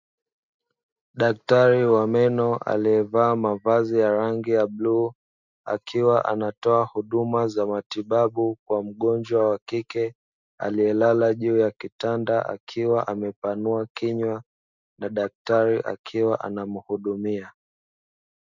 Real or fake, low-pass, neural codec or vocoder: real; 7.2 kHz; none